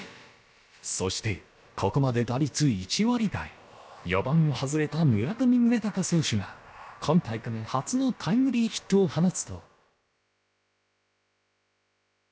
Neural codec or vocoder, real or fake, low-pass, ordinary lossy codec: codec, 16 kHz, about 1 kbps, DyCAST, with the encoder's durations; fake; none; none